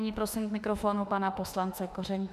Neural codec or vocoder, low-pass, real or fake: autoencoder, 48 kHz, 32 numbers a frame, DAC-VAE, trained on Japanese speech; 14.4 kHz; fake